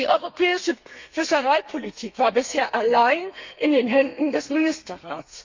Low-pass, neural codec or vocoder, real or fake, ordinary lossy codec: 7.2 kHz; codec, 32 kHz, 1.9 kbps, SNAC; fake; MP3, 48 kbps